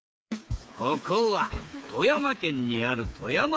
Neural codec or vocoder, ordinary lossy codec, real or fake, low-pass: codec, 16 kHz, 4 kbps, FreqCodec, smaller model; none; fake; none